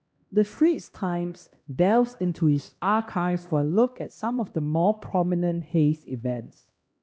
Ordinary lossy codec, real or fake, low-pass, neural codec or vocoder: none; fake; none; codec, 16 kHz, 1 kbps, X-Codec, HuBERT features, trained on LibriSpeech